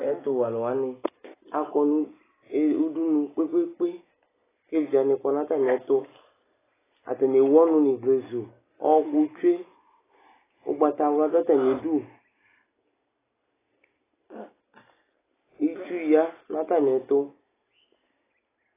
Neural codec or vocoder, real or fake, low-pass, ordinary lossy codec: none; real; 3.6 kHz; AAC, 16 kbps